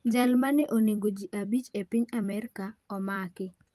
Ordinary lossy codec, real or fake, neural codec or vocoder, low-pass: Opus, 32 kbps; fake; vocoder, 44.1 kHz, 128 mel bands every 256 samples, BigVGAN v2; 14.4 kHz